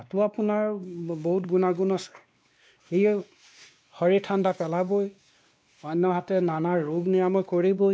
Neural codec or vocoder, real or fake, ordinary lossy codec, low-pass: codec, 16 kHz, 2 kbps, X-Codec, WavLM features, trained on Multilingual LibriSpeech; fake; none; none